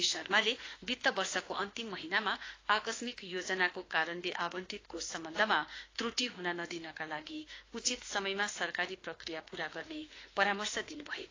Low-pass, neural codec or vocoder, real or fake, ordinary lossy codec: 7.2 kHz; autoencoder, 48 kHz, 32 numbers a frame, DAC-VAE, trained on Japanese speech; fake; AAC, 32 kbps